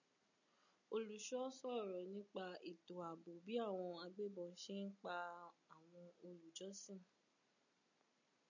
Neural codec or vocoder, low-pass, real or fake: none; 7.2 kHz; real